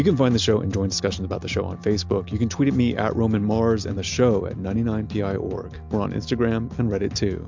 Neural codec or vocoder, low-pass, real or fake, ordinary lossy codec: none; 7.2 kHz; real; MP3, 64 kbps